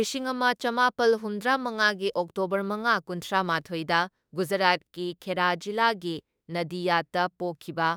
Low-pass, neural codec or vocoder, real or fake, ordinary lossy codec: none; autoencoder, 48 kHz, 32 numbers a frame, DAC-VAE, trained on Japanese speech; fake; none